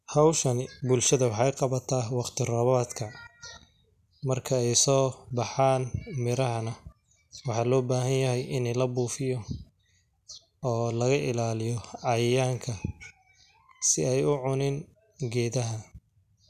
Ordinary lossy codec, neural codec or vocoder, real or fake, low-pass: none; none; real; 14.4 kHz